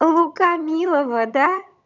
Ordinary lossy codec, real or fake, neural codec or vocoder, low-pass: none; fake; vocoder, 22.05 kHz, 80 mel bands, HiFi-GAN; 7.2 kHz